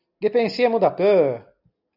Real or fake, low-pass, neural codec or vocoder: real; 5.4 kHz; none